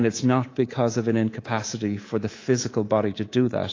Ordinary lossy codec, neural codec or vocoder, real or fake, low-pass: AAC, 32 kbps; codec, 24 kHz, 3.1 kbps, DualCodec; fake; 7.2 kHz